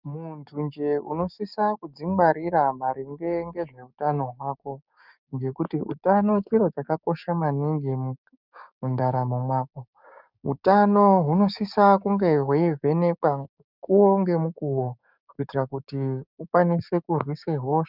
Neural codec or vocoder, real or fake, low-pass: codec, 16 kHz, 6 kbps, DAC; fake; 5.4 kHz